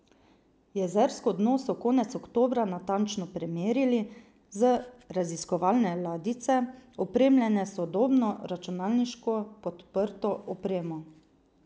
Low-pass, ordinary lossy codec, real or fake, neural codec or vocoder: none; none; real; none